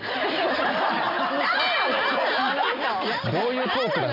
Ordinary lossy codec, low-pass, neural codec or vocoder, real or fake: none; 5.4 kHz; none; real